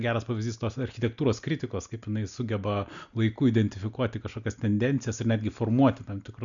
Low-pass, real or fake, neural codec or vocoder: 7.2 kHz; real; none